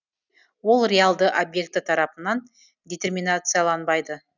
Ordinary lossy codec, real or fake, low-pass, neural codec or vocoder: none; real; 7.2 kHz; none